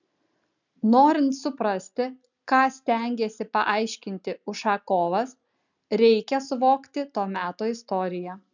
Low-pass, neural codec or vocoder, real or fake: 7.2 kHz; none; real